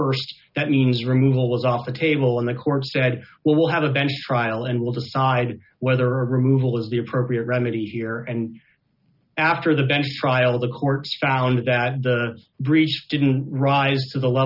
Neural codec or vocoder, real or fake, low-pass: none; real; 5.4 kHz